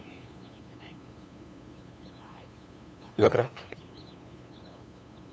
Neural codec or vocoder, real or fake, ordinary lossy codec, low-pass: codec, 16 kHz, 2 kbps, FunCodec, trained on LibriTTS, 25 frames a second; fake; none; none